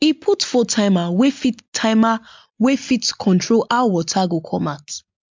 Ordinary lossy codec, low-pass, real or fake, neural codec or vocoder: MP3, 64 kbps; 7.2 kHz; real; none